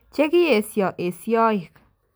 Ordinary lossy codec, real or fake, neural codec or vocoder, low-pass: none; real; none; none